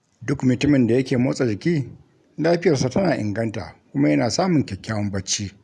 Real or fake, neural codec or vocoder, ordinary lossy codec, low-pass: real; none; none; none